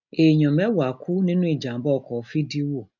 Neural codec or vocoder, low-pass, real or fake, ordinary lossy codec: none; 7.2 kHz; real; none